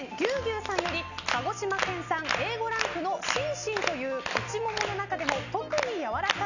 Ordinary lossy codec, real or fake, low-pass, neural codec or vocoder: none; real; 7.2 kHz; none